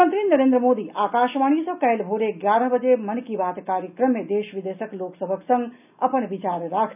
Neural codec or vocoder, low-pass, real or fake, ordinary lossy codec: none; 3.6 kHz; real; none